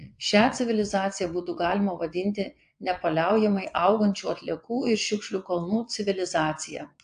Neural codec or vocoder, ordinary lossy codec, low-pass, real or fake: vocoder, 22.05 kHz, 80 mel bands, WaveNeXt; AAC, 64 kbps; 9.9 kHz; fake